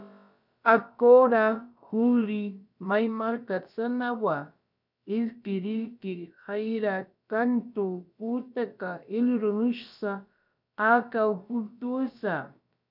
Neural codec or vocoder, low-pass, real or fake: codec, 16 kHz, about 1 kbps, DyCAST, with the encoder's durations; 5.4 kHz; fake